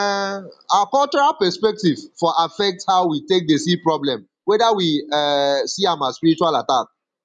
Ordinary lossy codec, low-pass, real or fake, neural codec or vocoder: none; 10.8 kHz; real; none